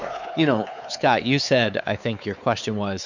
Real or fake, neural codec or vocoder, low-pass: fake; codec, 16 kHz, 4 kbps, X-Codec, WavLM features, trained on Multilingual LibriSpeech; 7.2 kHz